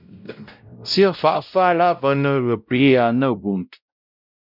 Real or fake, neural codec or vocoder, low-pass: fake; codec, 16 kHz, 0.5 kbps, X-Codec, WavLM features, trained on Multilingual LibriSpeech; 5.4 kHz